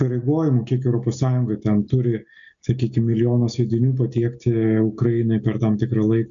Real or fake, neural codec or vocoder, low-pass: real; none; 7.2 kHz